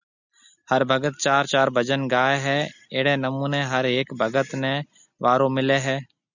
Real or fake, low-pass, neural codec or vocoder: real; 7.2 kHz; none